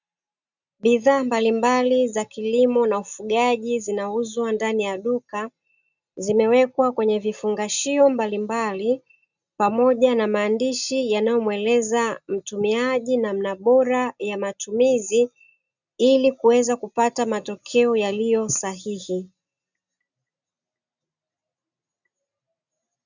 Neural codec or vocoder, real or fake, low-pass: none; real; 7.2 kHz